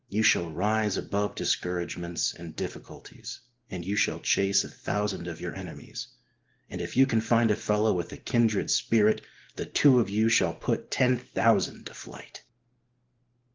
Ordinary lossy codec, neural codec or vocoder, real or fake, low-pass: Opus, 16 kbps; none; real; 7.2 kHz